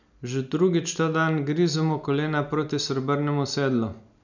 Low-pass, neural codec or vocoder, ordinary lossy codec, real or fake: 7.2 kHz; none; none; real